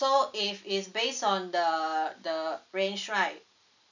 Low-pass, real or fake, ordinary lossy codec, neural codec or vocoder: 7.2 kHz; real; none; none